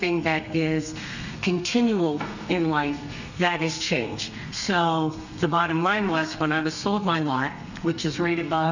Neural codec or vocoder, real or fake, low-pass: codec, 32 kHz, 1.9 kbps, SNAC; fake; 7.2 kHz